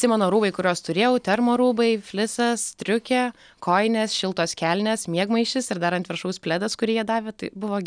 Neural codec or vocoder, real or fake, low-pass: none; real; 9.9 kHz